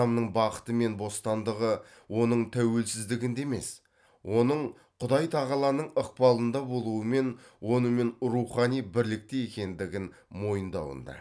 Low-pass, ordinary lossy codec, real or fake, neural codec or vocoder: none; none; real; none